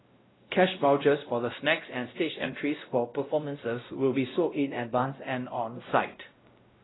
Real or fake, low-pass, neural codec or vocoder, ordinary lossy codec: fake; 7.2 kHz; codec, 16 kHz, 0.5 kbps, X-Codec, WavLM features, trained on Multilingual LibriSpeech; AAC, 16 kbps